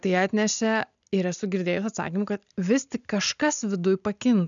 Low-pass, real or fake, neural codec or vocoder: 7.2 kHz; real; none